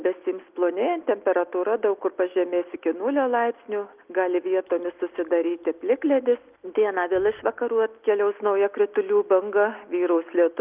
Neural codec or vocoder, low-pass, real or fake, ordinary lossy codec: none; 3.6 kHz; real; Opus, 24 kbps